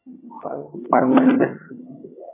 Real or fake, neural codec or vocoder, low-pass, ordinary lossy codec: fake; vocoder, 22.05 kHz, 80 mel bands, HiFi-GAN; 3.6 kHz; MP3, 16 kbps